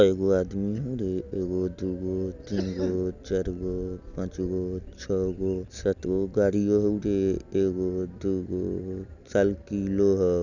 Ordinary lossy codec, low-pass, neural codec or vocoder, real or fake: none; 7.2 kHz; none; real